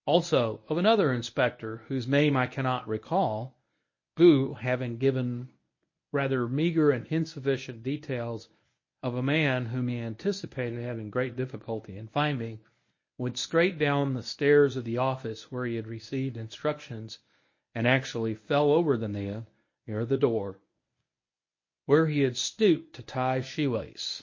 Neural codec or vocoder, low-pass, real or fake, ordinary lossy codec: codec, 24 kHz, 0.9 kbps, WavTokenizer, medium speech release version 1; 7.2 kHz; fake; MP3, 32 kbps